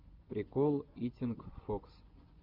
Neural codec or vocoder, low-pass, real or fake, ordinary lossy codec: vocoder, 24 kHz, 100 mel bands, Vocos; 5.4 kHz; fake; Opus, 32 kbps